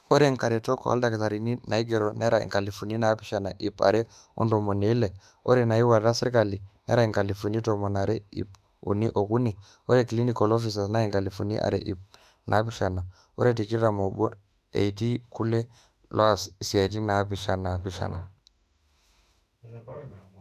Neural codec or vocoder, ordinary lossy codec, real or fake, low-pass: autoencoder, 48 kHz, 32 numbers a frame, DAC-VAE, trained on Japanese speech; none; fake; 14.4 kHz